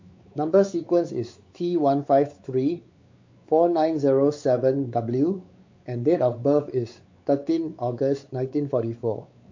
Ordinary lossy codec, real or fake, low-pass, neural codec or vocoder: MP3, 48 kbps; fake; 7.2 kHz; codec, 16 kHz, 4 kbps, X-Codec, WavLM features, trained on Multilingual LibriSpeech